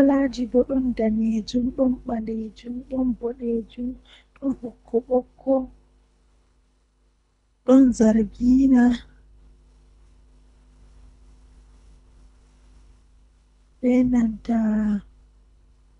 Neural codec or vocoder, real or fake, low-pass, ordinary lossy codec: codec, 24 kHz, 3 kbps, HILCodec; fake; 10.8 kHz; none